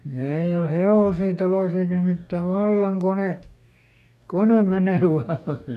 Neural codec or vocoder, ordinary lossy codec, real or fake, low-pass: codec, 44.1 kHz, 2.6 kbps, DAC; none; fake; 14.4 kHz